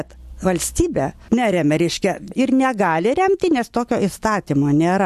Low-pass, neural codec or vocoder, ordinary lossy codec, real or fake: 14.4 kHz; none; MP3, 64 kbps; real